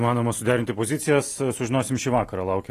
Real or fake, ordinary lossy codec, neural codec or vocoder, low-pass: real; AAC, 48 kbps; none; 14.4 kHz